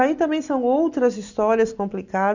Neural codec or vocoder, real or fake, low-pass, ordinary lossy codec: none; real; 7.2 kHz; none